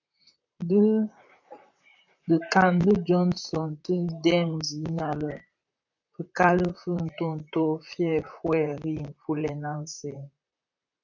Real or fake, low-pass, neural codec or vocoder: fake; 7.2 kHz; vocoder, 44.1 kHz, 128 mel bands, Pupu-Vocoder